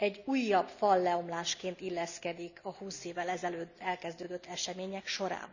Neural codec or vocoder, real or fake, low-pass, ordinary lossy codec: none; real; 7.2 kHz; none